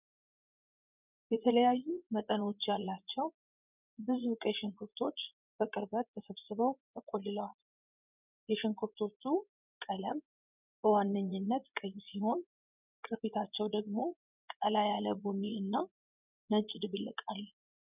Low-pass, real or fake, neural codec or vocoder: 3.6 kHz; fake; vocoder, 24 kHz, 100 mel bands, Vocos